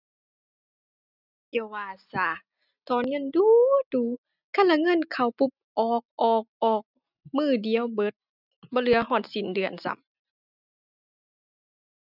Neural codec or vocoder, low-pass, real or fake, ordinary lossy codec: none; 5.4 kHz; real; none